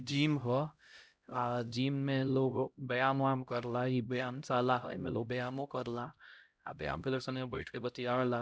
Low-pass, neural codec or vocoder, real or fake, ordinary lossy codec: none; codec, 16 kHz, 0.5 kbps, X-Codec, HuBERT features, trained on LibriSpeech; fake; none